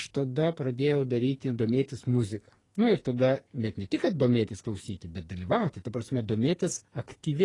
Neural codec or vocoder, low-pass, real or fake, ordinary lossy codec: codec, 44.1 kHz, 2.6 kbps, SNAC; 10.8 kHz; fake; AAC, 32 kbps